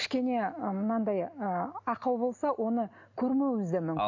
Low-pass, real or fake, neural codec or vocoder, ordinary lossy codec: 7.2 kHz; real; none; AAC, 48 kbps